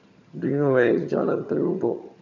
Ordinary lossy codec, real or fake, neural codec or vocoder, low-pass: none; fake; vocoder, 22.05 kHz, 80 mel bands, HiFi-GAN; 7.2 kHz